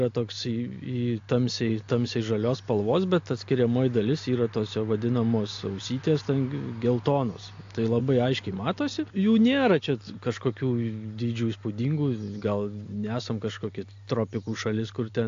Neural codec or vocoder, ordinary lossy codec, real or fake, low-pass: none; AAC, 48 kbps; real; 7.2 kHz